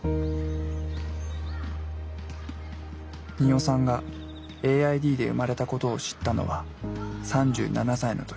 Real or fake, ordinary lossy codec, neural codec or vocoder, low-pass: real; none; none; none